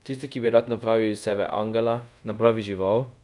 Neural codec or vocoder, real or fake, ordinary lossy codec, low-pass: codec, 24 kHz, 0.5 kbps, DualCodec; fake; none; 10.8 kHz